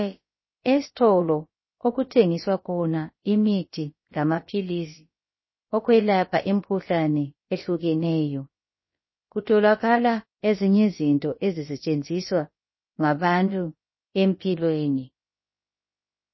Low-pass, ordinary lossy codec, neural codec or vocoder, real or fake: 7.2 kHz; MP3, 24 kbps; codec, 16 kHz, about 1 kbps, DyCAST, with the encoder's durations; fake